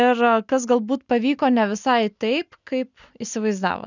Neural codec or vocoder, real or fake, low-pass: none; real; 7.2 kHz